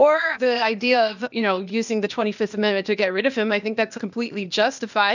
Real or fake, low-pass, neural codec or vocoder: fake; 7.2 kHz; codec, 16 kHz, 0.8 kbps, ZipCodec